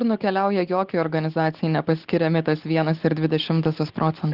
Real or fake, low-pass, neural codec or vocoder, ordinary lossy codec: real; 5.4 kHz; none; Opus, 16 kbps